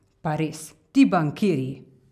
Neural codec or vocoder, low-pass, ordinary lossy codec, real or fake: none; 14.4 kHz; none; real